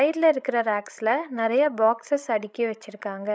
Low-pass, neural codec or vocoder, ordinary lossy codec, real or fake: none; codec, 16 kHz, 16 kbps, FreqCodec, larger model; none; fake